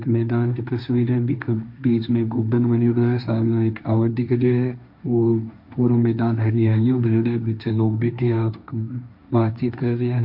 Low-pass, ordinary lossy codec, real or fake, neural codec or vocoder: 5.4 kHz; MP3, 48 kbps; fake; codec, 16 kHz, 1.1 kbps, Voila-Tokenizer